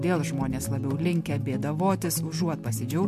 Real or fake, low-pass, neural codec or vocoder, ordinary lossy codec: real; 14.4 kHz; none; MP3, 64 kbps